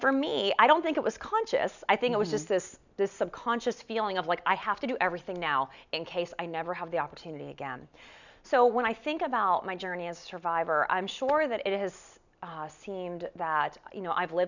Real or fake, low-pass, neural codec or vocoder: real; 7.2 kHz; none